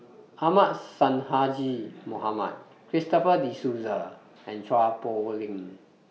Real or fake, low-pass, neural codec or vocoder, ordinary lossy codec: real; none; none; none